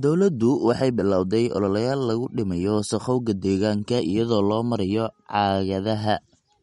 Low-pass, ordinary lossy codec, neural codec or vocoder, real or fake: 19.8 kHz; MP3, 48 kbps; none; real